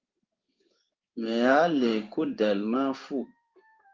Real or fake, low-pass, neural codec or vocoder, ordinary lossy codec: fake; 7.2 kHz; codec, 16 kHz in and 24 kHz out, 1 kbps, XY-Tokenizer; Opus, 32 kbps